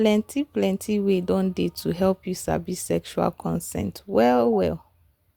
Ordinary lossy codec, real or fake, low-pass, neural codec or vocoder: Opus, 64 kbps; real; 19.8 kHz; none